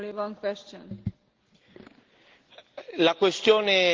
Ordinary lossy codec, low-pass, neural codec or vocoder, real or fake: Opus, 16 kbps; 7.2 kHz; none; real